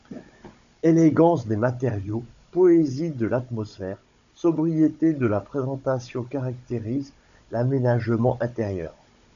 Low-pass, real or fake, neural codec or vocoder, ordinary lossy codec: 7.2 kHz; fake; codec, 16 kHz, 16 kbps, FunCodec, trained on Chinese and English, 50 frames a second; AAC, 96 kbps